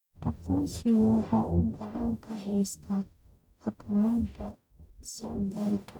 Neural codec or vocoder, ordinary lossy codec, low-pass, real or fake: codec, 44.1 kHz, 0.9 kbps, DAC; none; 19.8 kHz; fake